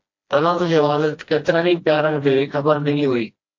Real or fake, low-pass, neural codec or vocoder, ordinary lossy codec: fake; 7.2 kHz; codec, 16 kHz, 1 kbps, FreqCodec, smaller model; AAC, 48 kbps